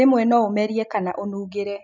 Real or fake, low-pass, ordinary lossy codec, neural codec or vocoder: real; 7.2 kHz; none; none